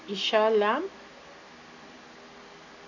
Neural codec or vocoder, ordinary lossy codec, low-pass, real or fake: none; none; 7.2 kHz; real